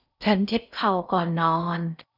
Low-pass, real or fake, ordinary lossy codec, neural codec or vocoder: 5.4 kHz; fake; none; codec, 16 kHz in and 24 kHz out, 0.6 kbps, FocalCodec, streaming, 4096 codes